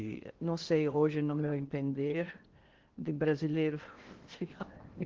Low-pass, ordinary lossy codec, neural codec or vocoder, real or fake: 7.2 kHz; Opus, 16 kbps; codec, 16 kHz in and 24 kHz out, 0.8 kbps, FocalCodec, streaming, 65536 codes; fake